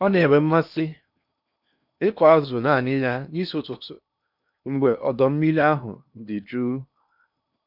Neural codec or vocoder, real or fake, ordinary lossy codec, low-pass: codec, 16 kHz in and 24 kHz out, 0.8 kbps, FocalCodec, streaming, 65536 codes; fake; none; 5.4 kHz